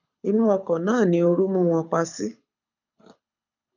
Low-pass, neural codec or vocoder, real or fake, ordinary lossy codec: 7.2 kHz; codec, 24 kHz, 6 kbps, HILCodec; fake; none